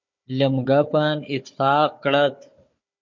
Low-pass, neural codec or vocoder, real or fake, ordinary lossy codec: 7.2 kHz; codec, 16 kHz, 4 kbps, FunCodec, trained on Chinese and English, 50 frames a second; fake; MP3, 48 kbps